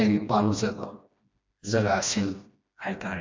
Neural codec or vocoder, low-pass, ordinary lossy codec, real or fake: codec, 16 kHz, 1 kbps, FreqCodec, smaller model; 7.2 kHz; MP3, 48 kbps; fake